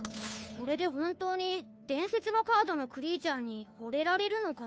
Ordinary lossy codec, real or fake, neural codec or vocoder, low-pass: none; fake; codec, 16 kHz, 2 kbps, FunCodec, trained on Chinese and English, 25 frames a second; none